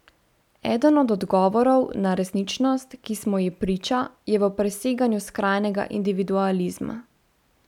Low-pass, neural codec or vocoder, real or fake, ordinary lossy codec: 19.8 kHz; none; real; none